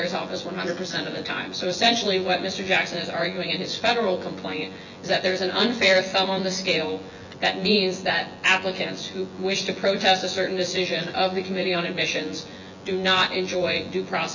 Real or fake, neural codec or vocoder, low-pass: fake; vocoder, 24 kHz, 100 mel bands, Vocos; 7.2 kHz